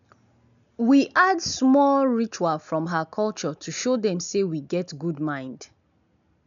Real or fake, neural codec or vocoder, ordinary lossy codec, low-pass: real; none; none; 7.2 kHz